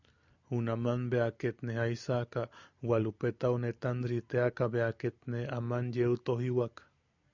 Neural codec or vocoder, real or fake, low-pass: none; real; 7.2 kHz